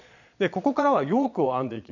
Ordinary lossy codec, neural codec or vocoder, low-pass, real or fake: none; vocoder, 22.05 kHz, 80 mel bands, Vocos; 7.2 kHz; fake